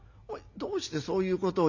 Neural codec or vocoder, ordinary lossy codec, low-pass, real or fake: none; none; 7.2 kHz; real